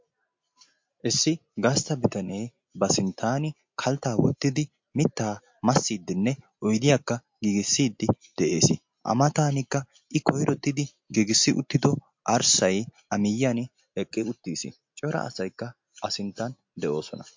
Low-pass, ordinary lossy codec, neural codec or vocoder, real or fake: 7.2 kHz; MP3, 48 kbps; none; real